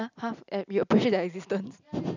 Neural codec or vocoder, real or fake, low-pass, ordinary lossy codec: none; real; 7.2 kHz; none